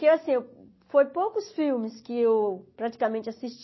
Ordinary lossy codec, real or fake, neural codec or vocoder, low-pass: MP3, 24 kbps; real; none; 7.2 kHz